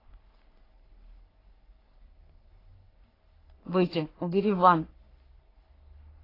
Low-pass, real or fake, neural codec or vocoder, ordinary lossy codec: 5.4 kHz; fake; codec, 44.1 kHz, 3.4 kbps, Pupu-Codec; AAC, 24 kbps